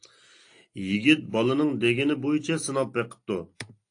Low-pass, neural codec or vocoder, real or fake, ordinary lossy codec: 9.9 kHz; none; real; AAC, 48 kbps